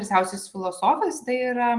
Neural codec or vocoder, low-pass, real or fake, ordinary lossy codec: none; 10.8 kHz; real; Opus, 24 kbps